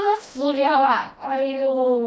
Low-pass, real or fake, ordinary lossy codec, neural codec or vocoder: none; fake; none; codec, 16 kHz, 1 kbps, FreqCodec, smaller model